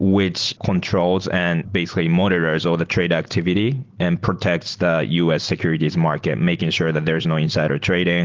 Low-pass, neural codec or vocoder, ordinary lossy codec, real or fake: 7.2 kHz; none; Opus, 16 kbps; real